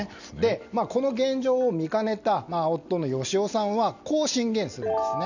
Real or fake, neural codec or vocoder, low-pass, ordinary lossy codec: real; none; 7.2 kHz; none